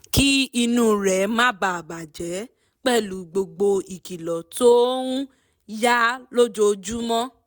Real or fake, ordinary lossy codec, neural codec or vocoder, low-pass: real; none; none; none